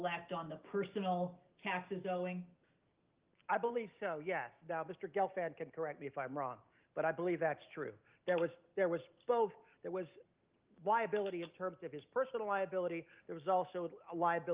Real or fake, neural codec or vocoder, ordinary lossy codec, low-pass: real; none; Opus, 24 kbps; 3.6 kHz